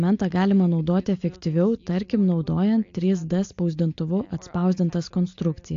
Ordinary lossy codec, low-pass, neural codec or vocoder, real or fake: AAC, 48 kbps; 7.2 kHz; none; real